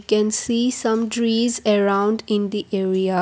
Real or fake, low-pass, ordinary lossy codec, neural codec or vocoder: real; none; none; none